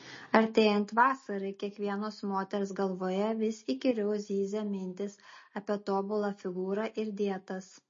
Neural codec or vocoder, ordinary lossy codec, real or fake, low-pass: none; MP3, 32 kbps; real; 7.2 kHz